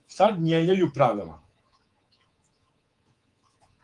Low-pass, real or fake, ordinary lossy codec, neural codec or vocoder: 10.8 kHz; fake; Opus, 24 kbps; codec, 24 kHz, 3.1 kbps, DualCodec